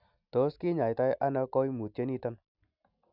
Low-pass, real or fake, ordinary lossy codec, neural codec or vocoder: 5.4 kHz; real; none; none